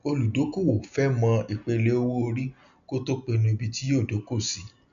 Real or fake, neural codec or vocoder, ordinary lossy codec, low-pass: real; none; none; 7.2 kHz